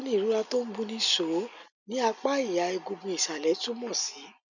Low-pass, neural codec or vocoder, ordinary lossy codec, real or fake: 7.2 kHz; none; none; real